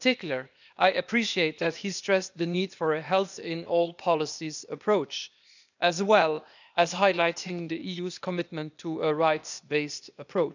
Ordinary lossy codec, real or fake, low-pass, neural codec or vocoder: none; fake; 7.2 kHz; codec, 16 kHz, 0.8 kbps, ZipCodec